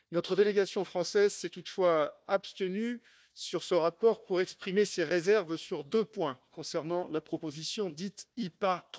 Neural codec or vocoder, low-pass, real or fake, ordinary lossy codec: codec, 16 kHz, 1 kbps, FunCodec, trained on Chinese and English, 50 frames a second; none; fake; none